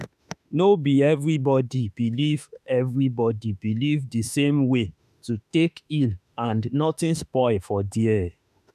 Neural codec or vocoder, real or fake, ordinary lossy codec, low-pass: autoencoder, 48 kHz, 32 numbers a frame, DAC-VAE, trained on Japanese speech; fake; none; 14.4 kHz